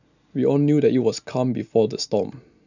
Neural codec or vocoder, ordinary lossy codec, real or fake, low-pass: none; none; real; 7.2 kHz